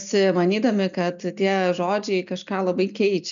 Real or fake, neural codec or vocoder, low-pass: real; none; 7.2 kHz